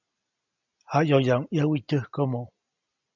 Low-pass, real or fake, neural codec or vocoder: 7.2 kHz; real; none